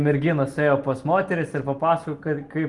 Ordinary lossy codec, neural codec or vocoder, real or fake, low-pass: Opus, 24 kbps; none; real; 10.8 kHz